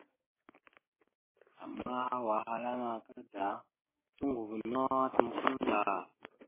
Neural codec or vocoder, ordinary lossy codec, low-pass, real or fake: codec, 16 kHz, 6 kbps, DAC; MP3, 16 kbps; 3.6 kHz; fake